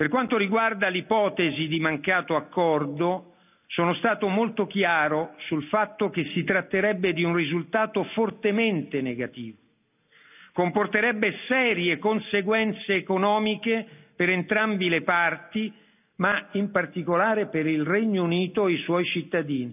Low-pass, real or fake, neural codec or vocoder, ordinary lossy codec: 3.6 kHz; real; none; none